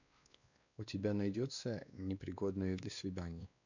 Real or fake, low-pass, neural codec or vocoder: fake; 7.2 kHz; codec, 16 kHz, 2 kbps, X-Codec, WavLM features, trained on Multilingual LibriSpeech